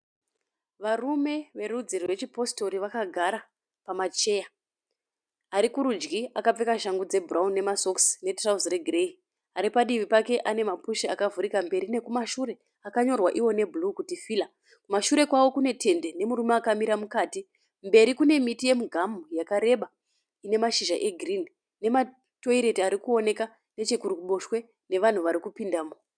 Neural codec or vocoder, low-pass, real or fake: none; 9.9 kHz; real